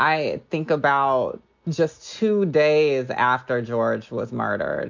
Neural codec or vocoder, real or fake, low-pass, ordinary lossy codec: none; real; 7.2 kHz; MP3, 64 kbps